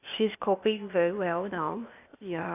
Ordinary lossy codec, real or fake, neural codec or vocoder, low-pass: none; fake; codec, 16 kHz, 0.8 kbps, ZipCodec; 3.6 kHz